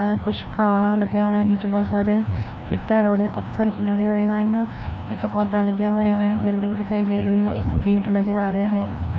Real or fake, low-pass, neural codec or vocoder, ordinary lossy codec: fake; none; codec, 16 kHz, 1 kbps, FreqCodec, larger model; none